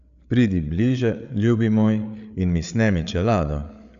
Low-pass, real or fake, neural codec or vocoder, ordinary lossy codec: 7.2 kHz; fake; codec, 16 kHz, 8 kbps, FreqCodec, larger model; none